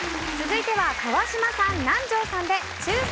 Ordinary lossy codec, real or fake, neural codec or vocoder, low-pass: none; real; none; none